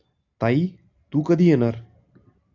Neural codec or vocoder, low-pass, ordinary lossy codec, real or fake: none; 7.2 kHz; AAC, 48 kbps; real